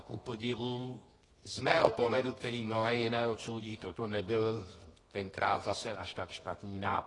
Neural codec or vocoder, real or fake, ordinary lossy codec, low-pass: codec, 24 kHz, 0.9 kbps, WavTokenizer, medium music audio release; fake; AAC, 32 kbps; 10.8 kHz